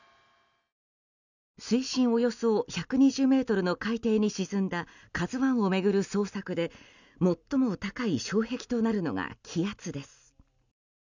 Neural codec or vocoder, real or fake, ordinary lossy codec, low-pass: none; real; none; 7.2 kHz